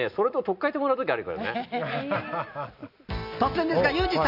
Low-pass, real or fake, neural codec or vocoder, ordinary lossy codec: 5.4 kHz; real; none; none